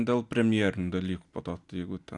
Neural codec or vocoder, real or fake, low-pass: none; real; 10.8 kHz